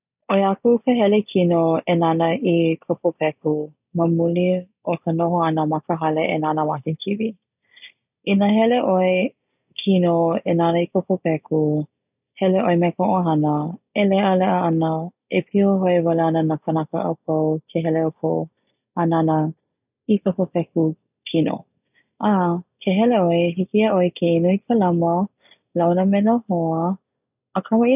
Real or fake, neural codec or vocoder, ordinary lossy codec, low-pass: real; none; none; 3.6 kHz